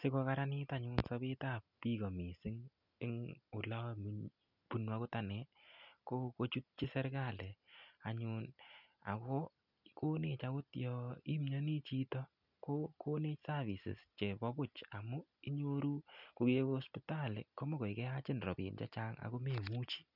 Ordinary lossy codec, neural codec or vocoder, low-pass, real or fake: none; none; 5.4 kHz; real